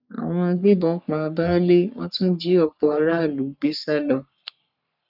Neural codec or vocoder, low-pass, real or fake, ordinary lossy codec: codec, 44.1 kHz, 3.4 kbps, Pupu-Codec; 5.4 kHz; fake; none